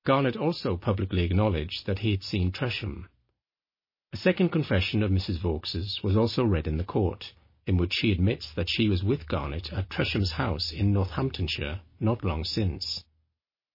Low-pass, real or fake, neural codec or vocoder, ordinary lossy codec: 5.4 kHz; real; none; MP3, 24 kbps